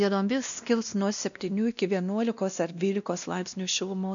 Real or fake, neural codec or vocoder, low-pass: fake; codec, 16 kHz, 1 kbps, X-Codec, WavLM features, trained on Multilingual LibriSpeech; 7.2 kHz